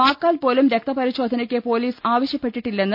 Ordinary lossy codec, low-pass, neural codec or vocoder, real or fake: none; 5.4 kHz; none; real